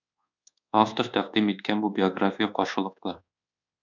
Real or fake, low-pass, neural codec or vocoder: fake; 7.2 kHz; codec, 24 kHz, 1.2 kbps, DualCodec